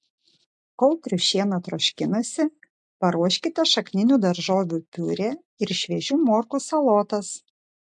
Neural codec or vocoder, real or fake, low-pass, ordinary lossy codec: none; real; 10.8 kHz; MP3, 64 kbps